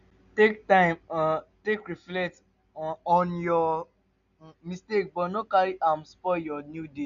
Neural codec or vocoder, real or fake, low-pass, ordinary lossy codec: none; real; 7.2 kHz; none